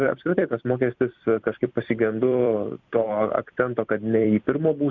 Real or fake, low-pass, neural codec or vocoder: fake; 7.2 kHz; vocoder, 44.1 kHz, 128 mel bands every 256 samples, BigVGAN v2